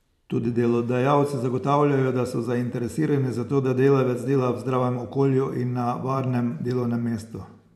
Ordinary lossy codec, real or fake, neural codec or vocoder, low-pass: none; fake; vocoder, 44.1 kHz, 128 mel bands every 512 samples, BigVGAN v2; 14.4 kHz